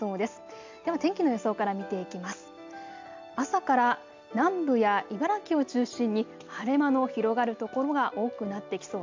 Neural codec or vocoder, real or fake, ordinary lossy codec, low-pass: none; real; MP3, 64 kbps; 7.2 kHz